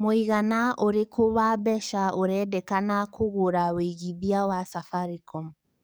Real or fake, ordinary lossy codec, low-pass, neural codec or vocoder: fake; none; none; codec, 44.1 kHz, 7.8 kbps, DAC